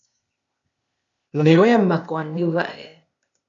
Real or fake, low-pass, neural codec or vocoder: fake; 7.2 kHz; codec, 16 kHz, 0.8 kbps, ZipCodec